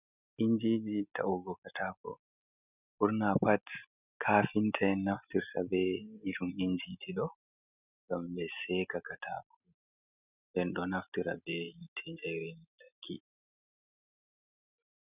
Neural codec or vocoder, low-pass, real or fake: none; 3.6 kHz; real